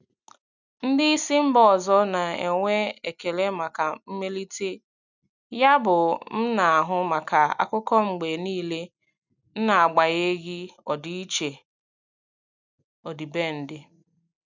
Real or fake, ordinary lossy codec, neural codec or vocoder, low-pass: real; none; none; 7.2 kHz